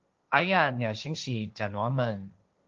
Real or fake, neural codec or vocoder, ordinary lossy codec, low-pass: fake; codec, 16 kHz, 1.1 kbps, Voila-Tokenizer; Opus, 32 kbps; 7.2 kHz